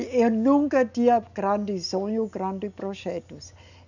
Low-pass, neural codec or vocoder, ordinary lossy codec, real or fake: 7.2 kHz; none; none; real